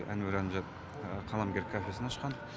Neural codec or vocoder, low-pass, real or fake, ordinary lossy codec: none; none; real; none